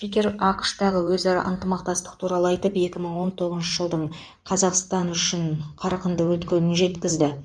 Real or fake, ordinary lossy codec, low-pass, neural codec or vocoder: fake; none; 9.9 kHz; codec, 16 kHz in and 24 kHz out, 2.2 kbps, FireRedTTS-2 codec